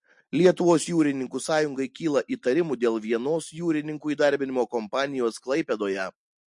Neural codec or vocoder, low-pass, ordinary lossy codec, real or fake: none; 10.8 kHz; MP3, 48 kbps; real